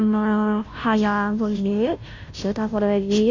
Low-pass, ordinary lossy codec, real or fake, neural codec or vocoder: 7.2 kHz; MP3, 64 kbps; fake; codec, 16 kHz, 0.5 kbps, FunCodec, trained on Chinese and English, 25 frames a second